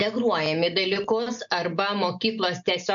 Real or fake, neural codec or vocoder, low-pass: real; none; 7.2 kHz